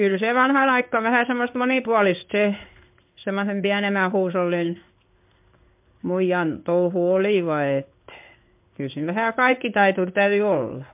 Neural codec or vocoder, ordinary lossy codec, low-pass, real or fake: codec, 16 kHz in and 24 kHz out, 1 kbps, XY-Tokenizer; MP3, 32 kbps; 3.6 kHz; fake